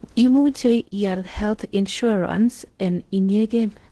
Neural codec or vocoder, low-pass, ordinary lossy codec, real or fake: codec, 16 kHz in and 24 kHz out, 0.6 kbps, FocalCodec, streaming, 4096 codes; 10.8 kHz; Opus, 16 kbps; fake